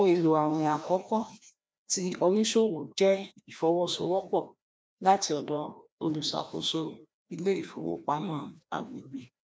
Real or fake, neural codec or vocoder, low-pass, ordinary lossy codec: fake; codec, 16 kHz, 1 kbps, FreqCodec, larger model; none; none